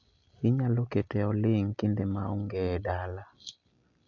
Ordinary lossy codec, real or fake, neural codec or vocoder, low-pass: none; real; none; 7.2 kHz